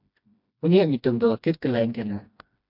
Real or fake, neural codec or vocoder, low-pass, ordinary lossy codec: fake; codec, 16 kHz, 1 kbps, FreqCodec, smaller model; 5.4 kHz; MP3, 48 kbps